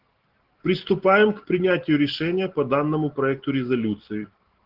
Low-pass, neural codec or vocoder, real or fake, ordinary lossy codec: 5.4 kHz; none; real; Opus, 16 kbps